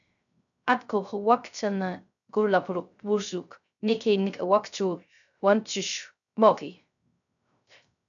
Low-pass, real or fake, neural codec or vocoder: 7.2 kHz; fake; codec, 16 kHz, 0.3 kbps, FocalCodec